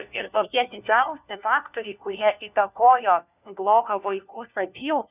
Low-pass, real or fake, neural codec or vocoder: 3.6 kHz; fake; codec, 16 kHz, 1 kbps, FunCodec, trained on LibriTTS, 50 frames a second